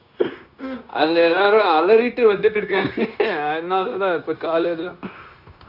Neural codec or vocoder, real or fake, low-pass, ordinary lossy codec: codec, 16 kHz, 0.9 kbps, LongCat-Audio-Codec; fake; 5.4 kHz; none